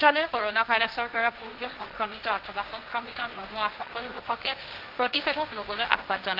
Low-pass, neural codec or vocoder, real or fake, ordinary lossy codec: 5.4 kHz; codec, 16 kHz, 1.1 kbps, Voila-Tokenizer; fake; Opus, 32 kbps